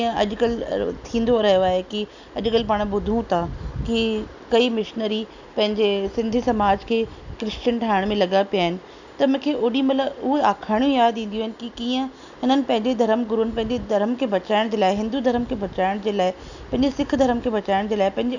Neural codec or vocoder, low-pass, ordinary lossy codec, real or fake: none; 7.2 kHz; none; real